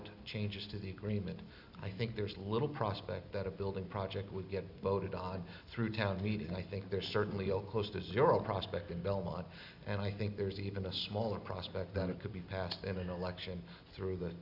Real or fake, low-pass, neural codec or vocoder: real; 5.4 kHz; none